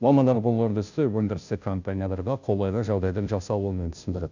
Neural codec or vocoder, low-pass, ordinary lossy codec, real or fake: codec, 16 kHz, 0.5 kbps, FunCodec, trained on Chinese and English, 25 frames a second; 7.2 kHz; none; fake